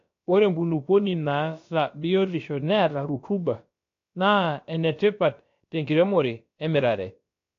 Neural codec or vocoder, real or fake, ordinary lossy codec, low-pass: codec, 16 kHz, about 1 kbps, DyCAST, with the encoder's durations; fake; AAC, 48 kbps; 7.2 kHz